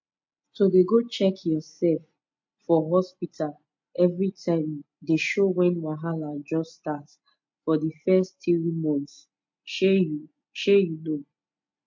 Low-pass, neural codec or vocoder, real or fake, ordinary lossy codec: 7.2 kHz; none; real; MP3, 48 kbps